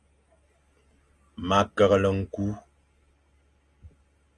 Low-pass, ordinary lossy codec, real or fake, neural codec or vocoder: 9.9 kHz; Opus, 32 kbps; real; none